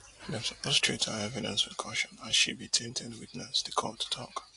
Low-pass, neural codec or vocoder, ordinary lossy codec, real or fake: 10.8 kHz; none; AAC, 48 kbps; real